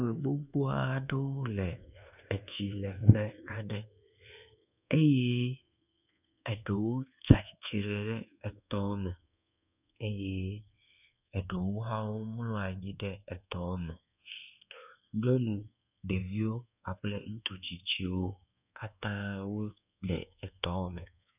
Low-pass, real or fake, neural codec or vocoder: 3.6 kHz; fake; codec, 24 kHz, 1.2 kbps, DualCodec